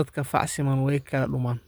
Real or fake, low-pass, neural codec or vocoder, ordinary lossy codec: real; none; none; none